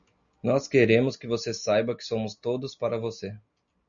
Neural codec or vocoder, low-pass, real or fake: none; 7.2 kHz; real